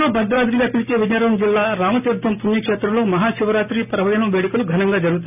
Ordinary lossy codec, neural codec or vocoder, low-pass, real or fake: none; none; 3.6 kHz; real